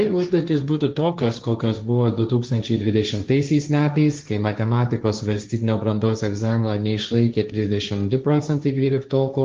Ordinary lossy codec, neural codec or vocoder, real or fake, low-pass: Opus, 24 kbps; codec, 16 kHz, 1.1 kbps, Voila-Tokenizer; fake; 7.2 kHz